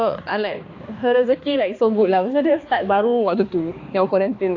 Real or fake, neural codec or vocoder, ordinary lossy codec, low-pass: fake; codec, 16 kHz, 2 kbps, X-Codec, HuBERT features, trained on balanced general audio; AAC, 48 kbps; 7.2 kHz